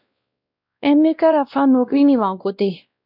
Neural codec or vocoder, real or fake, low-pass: codec, 16 kHz, 1 kbps, X-Codec, WavLM features, trained on Multilingual LibriSpeech; fake; 5.4 kHz